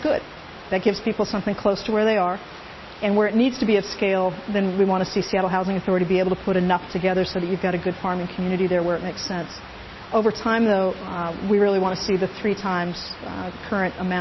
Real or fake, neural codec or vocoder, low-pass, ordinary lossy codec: real; none; 7.2 kHz; MP3, 24 kbps